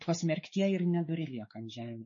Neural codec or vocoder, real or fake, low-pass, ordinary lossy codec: codec, 16 kHz, 4 kbps, X-Codec, WavLM features, trained on Multilingual LibriSpeech; fake; 7.2 kHz; MP3, 32 kbps